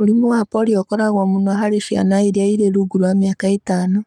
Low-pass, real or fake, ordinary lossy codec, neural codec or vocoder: 19.8 kHz; fake; none; codec, 44.1 kHz, 7.8 kbps, Pupu-Codec